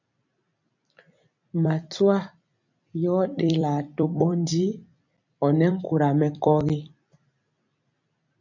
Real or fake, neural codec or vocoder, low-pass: fake; vocoder, 44.1 kHz, 128 mel bands every 256 samples, BigVGAN v2; 7.2 kHz